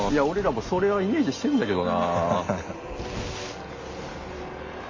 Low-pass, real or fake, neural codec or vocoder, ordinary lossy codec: 7.2 kHz; real; none; MP3, 48 kbps